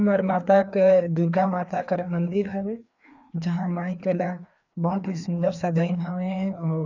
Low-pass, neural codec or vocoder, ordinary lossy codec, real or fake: 7.2 kHz; codec, 16 kHz, 2 kbps, FreqCodec, larger model; none; fake